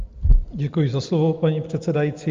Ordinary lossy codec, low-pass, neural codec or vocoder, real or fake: Opus, 32 kbps; 7.2 kHz; none; real